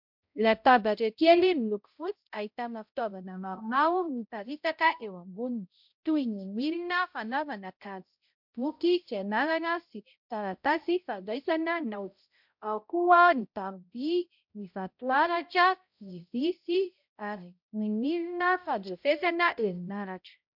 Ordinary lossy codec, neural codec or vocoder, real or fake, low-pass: MP3, 48 kbps; codec, 16 kHz, 0.5 kbps, X-Codec, HuBERT features, trained on balanced general audio; fake; 5.4 kHz